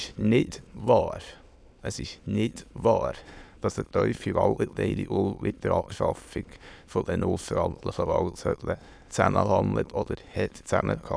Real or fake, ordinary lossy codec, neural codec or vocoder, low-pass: fake; none; autoencoder, 22.05 kHz, a latent of 192 numbers a frame, VITS, trained on many speakers; none